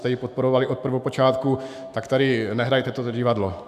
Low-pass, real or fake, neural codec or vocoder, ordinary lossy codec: 14.4 kHz; fake; autoencoder, 48 kHz, 128 numbers a frame, DAC-VAE, trained on Japanese speech; AAC, 96 kbps